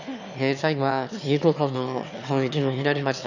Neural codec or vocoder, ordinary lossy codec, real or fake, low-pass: autoencoder, 22.05 kHz, a latent of 192 numbers a frame, VITS, trained on one speaker; none; fake; 7.2 kHz